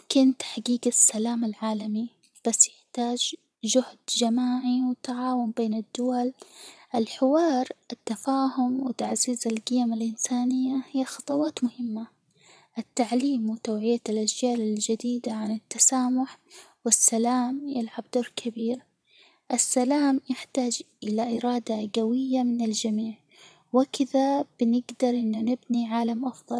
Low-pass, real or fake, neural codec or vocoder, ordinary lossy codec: none; fake; vocoder, 22.05 kHz, 80 mel bands, Vocos; none